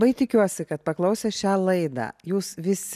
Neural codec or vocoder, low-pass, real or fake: none; 14.4 kHz; real